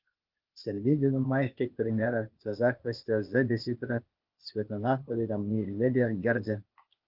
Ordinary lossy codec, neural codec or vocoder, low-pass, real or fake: Opus, 32 kbps; codec, 16 kHz, 0.8 kbps, ZipCodec; 5.4 kHz; fake